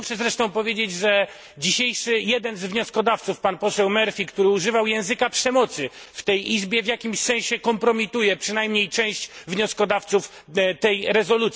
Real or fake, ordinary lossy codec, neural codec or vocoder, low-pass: real; none; none; none